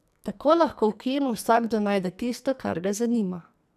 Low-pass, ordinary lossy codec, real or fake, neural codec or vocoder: 14.4 kHz; none; fake; codec, 44.1 kHz, 2.6 kbps, SNAC